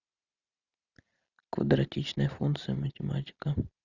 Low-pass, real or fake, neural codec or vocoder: 7.2 kHz; real; none